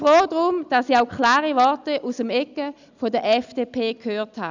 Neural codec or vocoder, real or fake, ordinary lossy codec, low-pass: none; real; none; 7.2 kHz